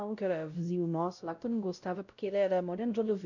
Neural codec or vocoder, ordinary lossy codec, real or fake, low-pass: codec, 16 kHz, 0.5 kbps, X-Codec, WavLM features, trained on Multilingual LibriSpeech; none; fake; 7.2 kHz